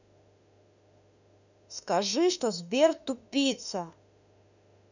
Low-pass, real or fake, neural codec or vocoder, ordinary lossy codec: 7.2 kHz; fake; autoencoder, 48 kHz, 32 numbers a frame, DAC-VAE, trained on Japanese speech; none